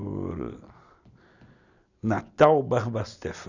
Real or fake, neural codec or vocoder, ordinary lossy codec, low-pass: real; none; none; 7.2 kHz